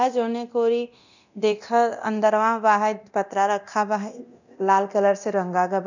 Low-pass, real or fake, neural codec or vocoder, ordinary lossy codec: 7.2 kHz; fake; codec, 24 kHz, 0.9 kbps, DualCodec; none